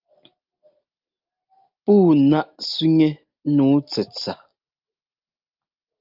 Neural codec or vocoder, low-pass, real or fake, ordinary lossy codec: none; 5.4 kHz; real; Opus, 24 kbps